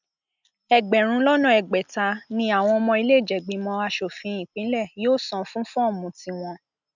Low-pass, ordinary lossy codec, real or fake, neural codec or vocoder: 7.2 kHz; none; real; none